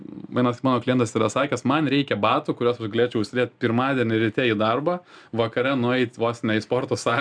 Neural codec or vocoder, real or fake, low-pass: none; real; 9.9 kHz